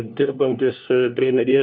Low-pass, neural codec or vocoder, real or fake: 7.2 kHz; codec, 16 kHz, 1 kbps, FunCodec, trained on LibriTTS, 50 frames a second; fake